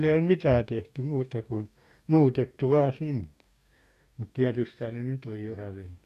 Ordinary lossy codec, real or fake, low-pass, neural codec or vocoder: none; fake; 14.4 kHz; codec, 44.1 kHz, 2.6 kbps, DAC